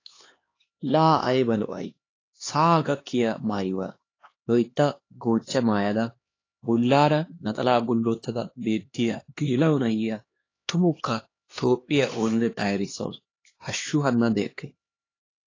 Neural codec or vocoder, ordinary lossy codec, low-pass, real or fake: codec, 16 kHz, 2 kbps, X-Codec, HuBERT features, trained on LibriSpeech; AAC, 32 kbps; 7.2 kHz; fake